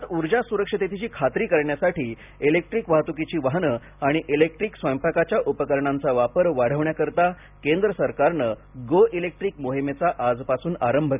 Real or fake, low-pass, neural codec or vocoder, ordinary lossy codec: real; 3.6 kHz; none; none